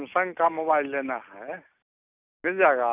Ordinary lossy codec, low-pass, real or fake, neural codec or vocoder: none; 3.6 kHz; real; none